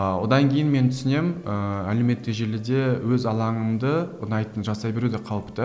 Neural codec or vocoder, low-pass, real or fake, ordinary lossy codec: none; none; real; none